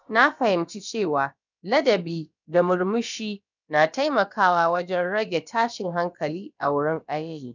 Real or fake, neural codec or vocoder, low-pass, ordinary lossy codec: fake; codec, 16 kHz, about 1 kbps, DyCAST, with the encoder's durations; 7.2 kHz; none